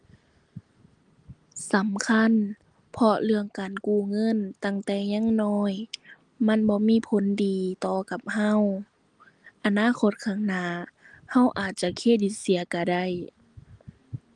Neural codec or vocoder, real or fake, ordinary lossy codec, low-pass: none; real; Opus, 24 kbps; 9.9 kHz